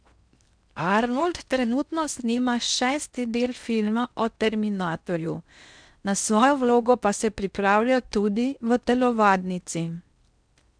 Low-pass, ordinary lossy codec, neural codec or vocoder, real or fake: 9.9 kHz; none; codec, 16 kHz in and 24 kHz out, 0.6 kbps, FocalCodec, streaming, 4096 codes; fake